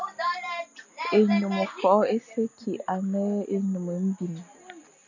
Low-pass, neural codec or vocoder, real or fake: 7.2 kHz; none; real